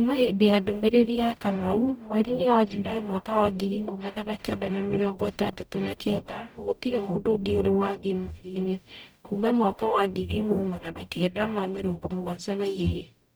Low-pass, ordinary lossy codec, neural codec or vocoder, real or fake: none; none; codec, 44.1 kHz, 0.9 kbps, DAC; fake